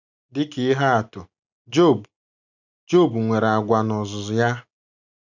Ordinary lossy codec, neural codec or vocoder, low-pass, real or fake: none; none; 7.2 kHz; real